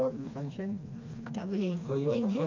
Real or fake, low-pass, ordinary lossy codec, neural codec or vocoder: fake; 7.2 kHz; none; codec, 16 kHz, 2 kbps, FreqCodec, smaller model